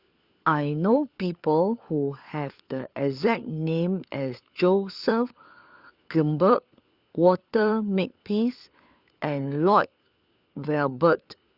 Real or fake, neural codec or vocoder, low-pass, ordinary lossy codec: fake; codec, 16 kHz, 4 kbps, FunCodec, trained on LibriTTS, 50 frames a second; 5.4 kHz; Opus, 64 kbps